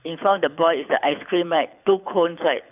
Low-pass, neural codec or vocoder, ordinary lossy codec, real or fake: 3.6 kHz; codec, 24 kHz, 6 kbps, HILCodec; none; fake